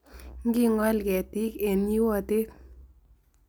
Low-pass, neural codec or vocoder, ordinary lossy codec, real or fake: none; none; none; real